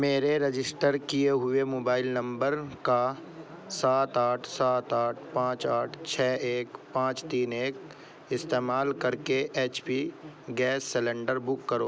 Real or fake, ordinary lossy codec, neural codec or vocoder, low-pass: real; none; none; none